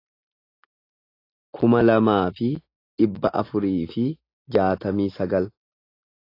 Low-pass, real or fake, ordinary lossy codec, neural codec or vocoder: 5.4 kHz; real; AAC, 32 kbps; none